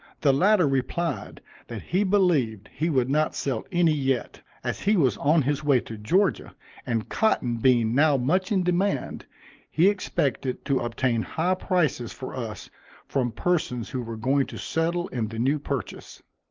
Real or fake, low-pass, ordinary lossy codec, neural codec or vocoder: real; 7.2 kHz; Opus, 32 kbps; none